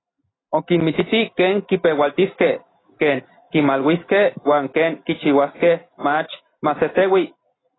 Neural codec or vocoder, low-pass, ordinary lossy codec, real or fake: none; 7.2 kHz; AAC, 16 kbps; real